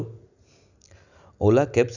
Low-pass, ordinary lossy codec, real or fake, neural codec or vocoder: 7.2 kHz; none; real; none